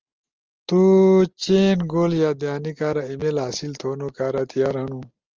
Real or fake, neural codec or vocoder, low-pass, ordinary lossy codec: real; none; 7.2 kHz; Opus, 24 kbps